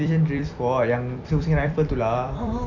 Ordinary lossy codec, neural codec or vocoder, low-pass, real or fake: none; vocoder, 44.1 kHz, 128 mel bands every 256 samples, BigVGAN v2; 7.2 kHz; fake